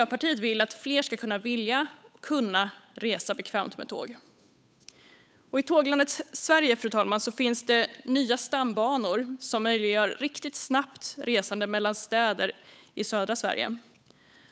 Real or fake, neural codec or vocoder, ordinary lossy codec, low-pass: fake; codec, 16 kHz, 8 kbps, FunCodec, trained on Chinese and English, 25 frames a second; none; none